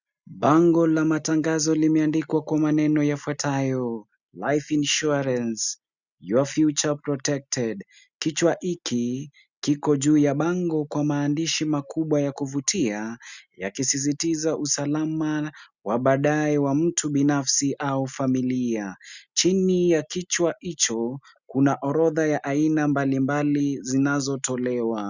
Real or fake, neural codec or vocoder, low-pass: real; none; 7.2 kHz